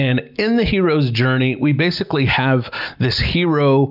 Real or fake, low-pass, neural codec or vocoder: real; 5.4 kHz; none